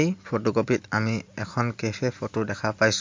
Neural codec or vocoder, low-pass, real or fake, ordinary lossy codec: none; 7.2 kHz; real; MP3, 48 kbps